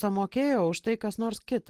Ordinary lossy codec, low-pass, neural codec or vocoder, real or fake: Opus, 16 kbps; 14.4 kHz; none; real